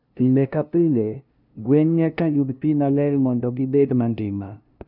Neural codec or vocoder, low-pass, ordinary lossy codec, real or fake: codec, 16 kHz, 0.5 kbps, FunCodec, trained on LibriTTS, 25 frames a second; 5.4 kHz; none; fake